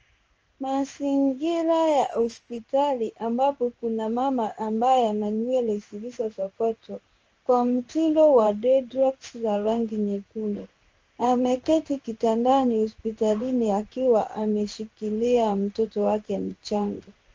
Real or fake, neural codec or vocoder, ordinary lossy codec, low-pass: fake; codec, 16 kHz in and 24 kHz out, 1 kbps, XY-Tokenizer; Opus, 24 kbps; 7.2 kHz